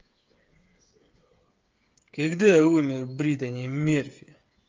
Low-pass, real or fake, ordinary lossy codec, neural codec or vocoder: 7.2 kHz; fake; Opus, 32 kbps; codec, 16 kHz, 8 kbps, FreqCodec, smaller model